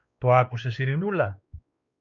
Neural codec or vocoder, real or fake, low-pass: codec, 16 kHz, 2 kbps, X-Codec, WavLM features, trained on Multilingual LibriSpeech; fake; 7.2 kHz